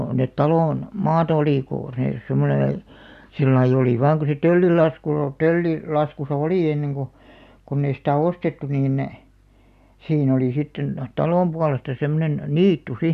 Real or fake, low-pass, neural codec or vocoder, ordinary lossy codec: real; 14.4 kHz; none; none